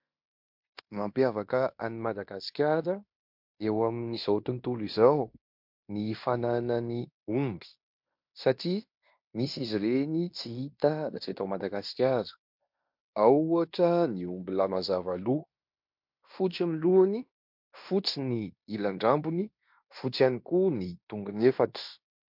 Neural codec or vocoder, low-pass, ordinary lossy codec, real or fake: codec, 16 kHz in and 24 kHz out, 0.9 kbps, LongCat-Audio-Codec, fine tuned four codebook decoder; 5.4 kHz; MP3, 48 kbps; fake